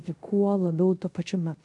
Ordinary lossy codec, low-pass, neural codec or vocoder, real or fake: MP3, 96 kbps; 10.8 kHz; codec, 24 kHz, 0.5 kbps, DualCodec; fake